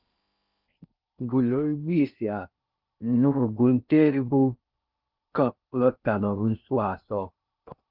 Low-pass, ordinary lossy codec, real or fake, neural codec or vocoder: 5.4 kHz; Opus, 24 kbps; fake; codec, 16 kHz in and 24 kHz out, 0.6 kbps, FocalCodec, streaming, 4096 codes